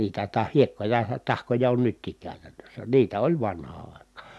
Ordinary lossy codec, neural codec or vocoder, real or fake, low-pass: none; none; real; none